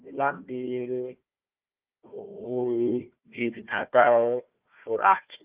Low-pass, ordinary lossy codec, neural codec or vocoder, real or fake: 3.6 kHz; Opus, 24 kbps; codec, 16 kHz, 1 kbps, FunCodec, trained on Chinese and English, 50 frames a second; fake